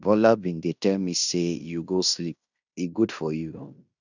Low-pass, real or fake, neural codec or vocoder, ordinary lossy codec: 7.2 kHz; fake; codec, 16 kHz in and 24 kHz out, 0.9 kbps, LongCat-Audio-Codec, fine tuned four codebook decoder; none